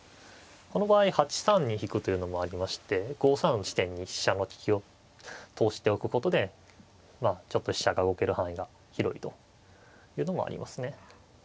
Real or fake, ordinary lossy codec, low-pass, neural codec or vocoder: real; none; none; none